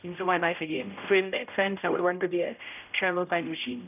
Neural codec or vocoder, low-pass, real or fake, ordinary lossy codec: codec, 16 kHz, 0.5 kbps, X-Codec, HuBERT features, trained on general audio; 3.6 kHz; fake; none